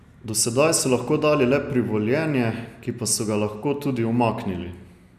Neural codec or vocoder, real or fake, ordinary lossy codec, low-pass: none; real; none; 14.4 kHz